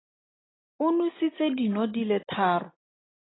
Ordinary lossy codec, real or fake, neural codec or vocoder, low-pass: AAC, 16 kbps; real; none; 7.2 kHz